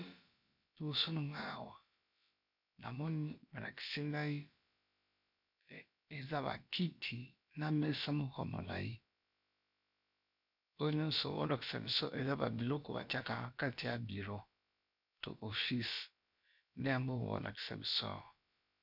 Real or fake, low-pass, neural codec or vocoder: fake; 5.4 kHz; codec, 16 kHz, about 1 kbps, DyCAST, with the encoder's durations